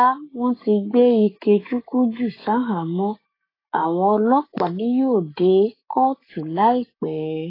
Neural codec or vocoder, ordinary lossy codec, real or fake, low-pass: codec, 44.1 kHz, 7.8 kbps, Pupu-Codec; AAC, 24 kbps; fake; 5.4 kHz